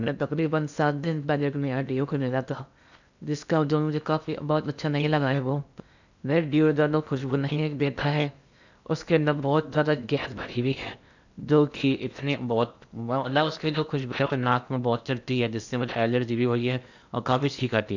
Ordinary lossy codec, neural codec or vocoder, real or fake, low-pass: none; codec, 16 kHz in and 24 kHz out, 0.8 kbps, FocalCodec, streaming, 65536 codes; fake; 7.2 kHz